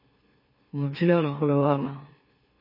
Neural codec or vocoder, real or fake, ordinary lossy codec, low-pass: autoencoder, 44.1 kHz, a latent of 192 numbers a frame, MeloTTS; fake; MP3, 24 kbps; 5.4 kHz